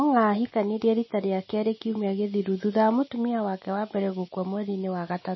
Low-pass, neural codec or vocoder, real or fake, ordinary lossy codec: 7.2 kHz; none; real; MP3, 24 kbps